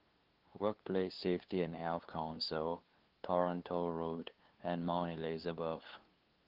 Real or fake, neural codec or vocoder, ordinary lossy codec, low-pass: fake; codec, 16 kHz, 2 kbps, FunCodec, trained on LibriTTS, 25 frames a second; Opus, 16 kbps; 5.4 kHz